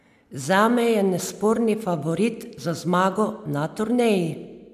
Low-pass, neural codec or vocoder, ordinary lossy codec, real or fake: 14.4 kHz; none; none; real